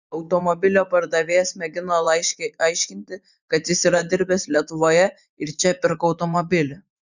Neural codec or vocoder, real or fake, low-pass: vocoder, 24 kHz, 100 mel bands, Vocos; fake; 7.2 kHz